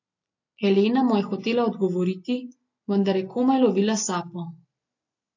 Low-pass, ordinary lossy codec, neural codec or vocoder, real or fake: 7.2 kHz; AAC, 48 kbps; none; real